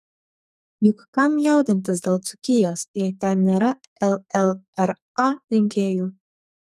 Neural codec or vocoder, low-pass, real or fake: codec, 44.1 kHz, 2.6 kbps, SNAC; 14.4 kHz; fake